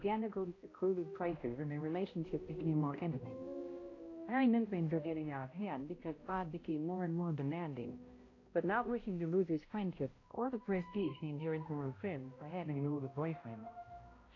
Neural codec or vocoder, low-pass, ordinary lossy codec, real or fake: codec, 16 kHz, 0.5 kbps, X-Codec, HuBERT features, trained on balanced general audio; 7.2 kHz; AAC, 32 kbps; fake